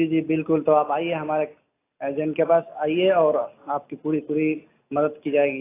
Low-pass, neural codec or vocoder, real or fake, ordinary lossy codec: 3.6 kHz; none; real; AAC, 24 kbps